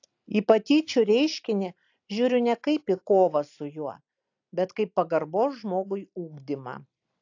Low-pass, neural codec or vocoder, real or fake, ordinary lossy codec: 7.2 kHz; none; real; AAC, 48 kbps